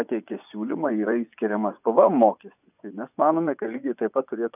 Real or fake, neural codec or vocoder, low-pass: fake; vocoder, 24 kHz, 100 mel bands, Vocos; 3.6 kHz